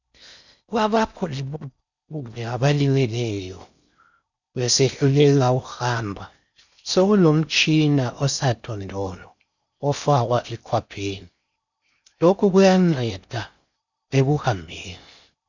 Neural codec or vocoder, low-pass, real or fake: codec, 16 kHz in and 24 kHz out, 0.6 kbps, FocalCodec, streaming, 4096 codes; 7.2 kHz; fake